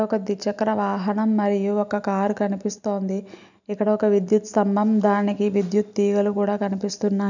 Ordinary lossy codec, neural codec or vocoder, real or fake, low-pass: none; none; real; 7.2 kHz